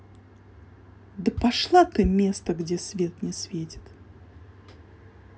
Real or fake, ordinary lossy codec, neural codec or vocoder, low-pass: real; none; none; none